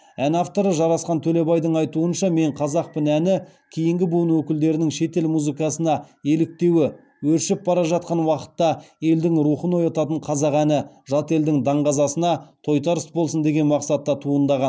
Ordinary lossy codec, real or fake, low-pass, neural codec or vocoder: none; real; none; none